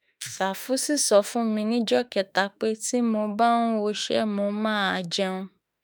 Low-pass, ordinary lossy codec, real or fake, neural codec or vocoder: none; none; fake; autoencoder, 48 kHz, 32 numbers a frame, DAC-VAE, trained on Japanese speech